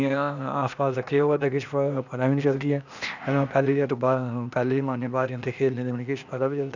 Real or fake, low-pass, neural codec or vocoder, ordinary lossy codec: fake; 7.2 kHz; codec, 16 kHz, 0.8 kbps, ZipCodec; none